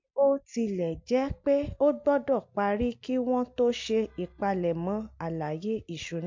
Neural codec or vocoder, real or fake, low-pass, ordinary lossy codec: none; real; 7.2 kHz; MP3, 48 kbps